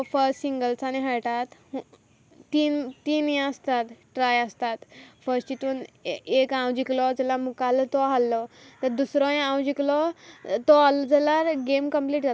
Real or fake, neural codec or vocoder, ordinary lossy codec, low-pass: real; none; none; none